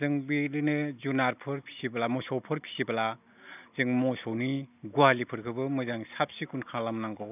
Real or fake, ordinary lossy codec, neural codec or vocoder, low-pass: real; none; none; 3.6 kHz